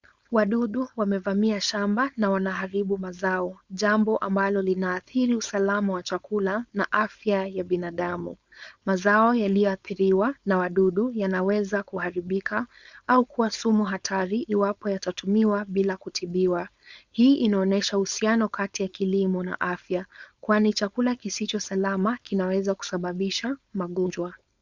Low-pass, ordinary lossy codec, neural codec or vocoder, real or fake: 7.2 kHz; Opus, 64 kbps; codec, 16 kHz, 4.8 kbps, FACodec; fake